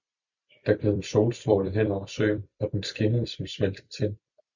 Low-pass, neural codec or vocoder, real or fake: 7.2 kHz; vocoder, 44.1 kHz, 128 mel bands every 256 samples, BigVGAN v2; fake